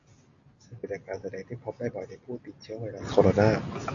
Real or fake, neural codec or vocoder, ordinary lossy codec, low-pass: real; none; AAC, 64 kbps; 7.2 kHz